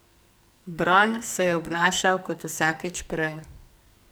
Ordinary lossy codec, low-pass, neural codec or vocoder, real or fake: none; none; codec, 44.1 kHz, 2.6 kbps, SNAC; fake